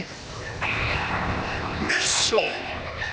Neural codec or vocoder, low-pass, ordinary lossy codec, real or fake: codec, 16 kHz, 0.8 kbps, ZipCodec; none; none; fake